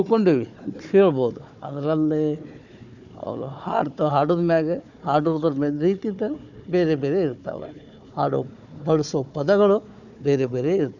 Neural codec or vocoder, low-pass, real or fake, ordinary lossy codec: codec, 16 kHz, 4 kbps, FunCodec, trained on Chinese and English, 50 frames a second; 7.2 kHz; fake; none